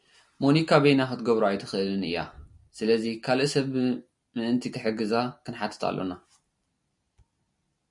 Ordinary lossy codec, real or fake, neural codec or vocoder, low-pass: MP3, 96 kbps; real; none; 10.8 kHz